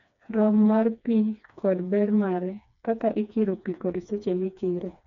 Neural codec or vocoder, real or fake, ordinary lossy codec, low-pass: codec, 16 kHz, 2 kbps, FreqCodec, smaller model; fake; none; 7.2 kHz